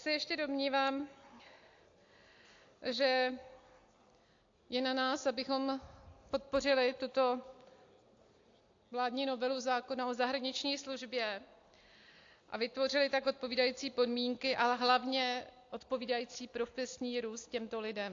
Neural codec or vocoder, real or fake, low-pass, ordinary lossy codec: none; real; 7.2 kHz; AAC, 48 kbps